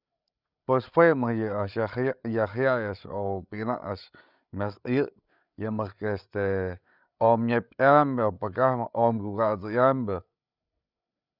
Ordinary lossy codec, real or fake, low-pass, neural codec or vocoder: none; fake; 5.4 kHz; codec, 16 kHz, 8 kbps, FreqCodec, larger model